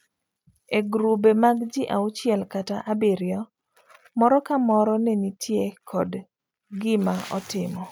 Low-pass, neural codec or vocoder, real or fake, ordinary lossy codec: none; none; real; none